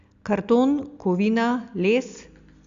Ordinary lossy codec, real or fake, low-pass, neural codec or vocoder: Opus, 64 kbps; real; 7.2 kHz; none